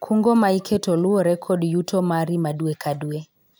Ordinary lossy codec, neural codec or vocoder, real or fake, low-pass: none; none; real; none